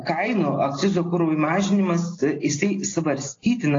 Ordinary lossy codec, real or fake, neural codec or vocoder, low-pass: AAC, 32 kbps; real; none; 7.2 kHz